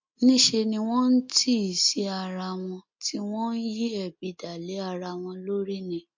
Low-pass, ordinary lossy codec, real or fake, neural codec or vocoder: 7.2 kHz; MP3, 48 kbps; real; none